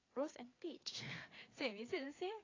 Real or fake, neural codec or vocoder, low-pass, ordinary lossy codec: fake; codec, 16 kHz, 4 kbps, FreqCodec, larger model; 7.2 kHz; AAC, 32 kbps